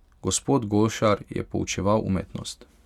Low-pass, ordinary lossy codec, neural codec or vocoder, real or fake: 19.8 kHz; none; none; real